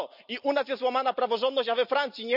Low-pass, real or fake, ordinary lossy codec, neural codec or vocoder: 5.4 kHz; real; none; none